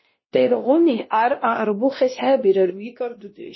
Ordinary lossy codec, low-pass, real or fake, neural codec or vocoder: MP3, 24 kbps; 7.2 kHz; fake; codec, 16 kHz, 1 kbps, X-Codec, WavLM features, trained on Multilingual LibriSpeech